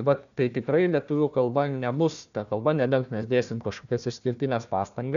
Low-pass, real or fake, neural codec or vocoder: 7.2 kHz; fake; codec, 16 kHz, 1 kbps, FunCodec, trained on Chinese and English, 50 frames a second